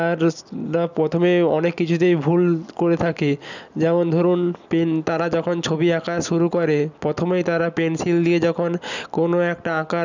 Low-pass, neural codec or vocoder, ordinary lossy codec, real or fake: 7.2 kHz; none; none; real